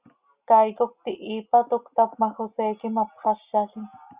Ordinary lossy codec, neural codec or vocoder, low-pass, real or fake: Opus, 64 kbps; none; 3.6 kHz; real